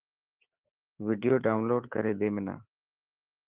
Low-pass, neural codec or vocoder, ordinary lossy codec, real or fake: 3.6 kHz; codec, 24 kHz, 3.1 kbps, DualCodec; Opus, 16 kbps; fake